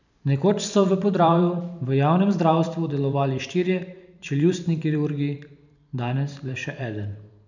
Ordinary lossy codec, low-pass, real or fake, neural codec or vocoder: none; 7.2 kHz; fake; vocoder, 44.1 kHz, 128 mel bands every 512 samples, BigVGAN v2